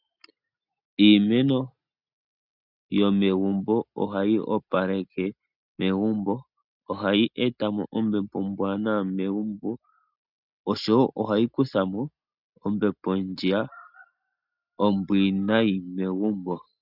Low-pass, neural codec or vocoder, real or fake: 5.4 kHz; none; real